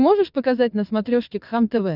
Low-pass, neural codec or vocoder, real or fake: 5.4 kHz; vocoder, 22.05 kHz, 80 mel bands, WaveNeXt; fake